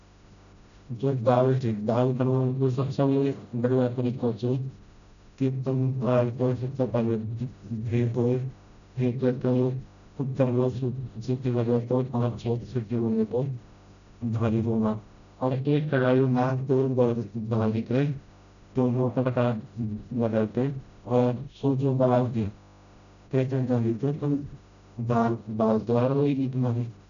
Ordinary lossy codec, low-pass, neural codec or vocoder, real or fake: none; 7.2 kHz; codec, 16 kHz, 0.5 kbps, FreqCodec, smaller model; fake